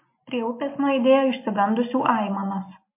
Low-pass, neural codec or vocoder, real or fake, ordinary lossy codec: 3.6 kHz; none; real; MP3, 24 kbps